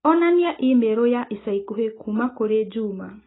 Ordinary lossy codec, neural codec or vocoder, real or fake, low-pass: AAC, 16 kbps; none; real; 7.2 kHz